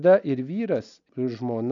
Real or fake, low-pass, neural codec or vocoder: real; 7.2 kHz; none